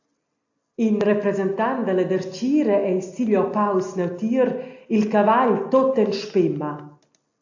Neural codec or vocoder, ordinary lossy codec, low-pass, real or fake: none; AAC, 48 kbps; 7.2 kHz; real